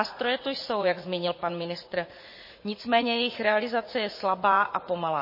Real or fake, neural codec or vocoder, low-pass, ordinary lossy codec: fake; vocoder, 44.1 kHz, 80 mel bands, Vocos; 5.4 kHz; MP3, 24 kbps